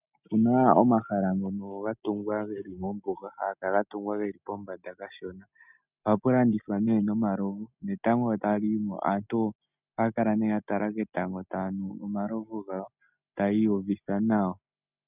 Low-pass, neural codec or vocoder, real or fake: 3.6 kHz; none; real